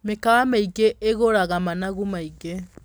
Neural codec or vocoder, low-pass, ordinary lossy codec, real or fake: none; none; none; real